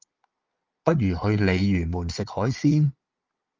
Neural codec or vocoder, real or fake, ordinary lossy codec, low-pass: vocoder, 22.05 kHz, 80 mel bands, Vocos; fake; Opus, 24 kbps; 7.2 kHz